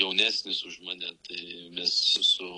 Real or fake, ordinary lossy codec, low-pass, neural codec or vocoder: real; AAC, 32 kbps; 10.8 kHz; none